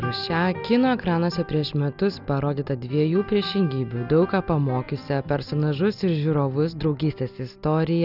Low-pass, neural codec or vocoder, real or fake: 5.4 kHz; none; real